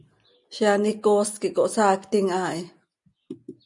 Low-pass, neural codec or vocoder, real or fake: 10.8 kHz; none; real